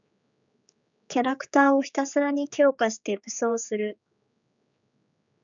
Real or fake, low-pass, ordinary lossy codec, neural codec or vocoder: fake; 7.2 kHz; MP3, 96 kbps; codec, 16 kHz, 4 kbps, X-Codec, HuBERT features, trained on general audio